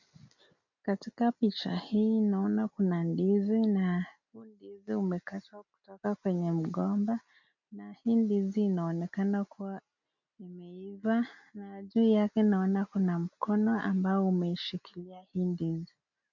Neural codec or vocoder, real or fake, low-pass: none; real; 7.2 kHz